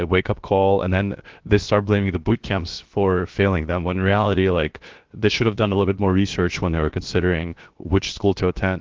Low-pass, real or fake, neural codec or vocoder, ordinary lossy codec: 7.2 kHz; fake; codec, 16 kHz, about 1 kbps, DyCAST, with the encoder's durations; Opus, 16 kbps